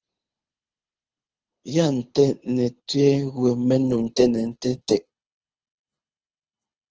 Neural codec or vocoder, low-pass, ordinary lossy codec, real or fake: codec, 24 kHz, 6 kbps, HILCodec; 7.2 kHz; Opus, 16 kbps; fake